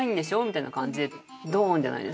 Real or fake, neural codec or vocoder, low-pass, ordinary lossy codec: real; none; none; none